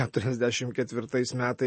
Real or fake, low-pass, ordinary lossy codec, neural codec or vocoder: real; 10.8 kHz; MP3, 32 kbps; none